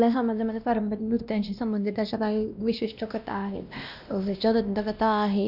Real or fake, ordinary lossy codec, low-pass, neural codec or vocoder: fake; none; 5.4 kHz; codec, 16 kHz, 1 kbps, X-Codec, WavLM features, trained on Multilingual LibriSpeech